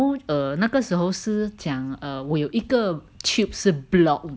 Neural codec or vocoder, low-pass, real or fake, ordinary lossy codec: none; none; real; none